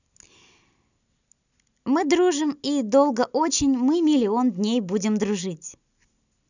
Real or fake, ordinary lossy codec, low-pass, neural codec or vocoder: real; none; 7.2 kHz; none